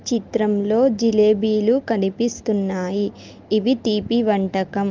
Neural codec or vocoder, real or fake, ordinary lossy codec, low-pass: none; real; Opus, 32 kbps; 7.2 kHz